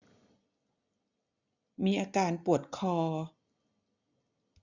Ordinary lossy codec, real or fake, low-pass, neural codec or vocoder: none; real; 7.2 kHz; none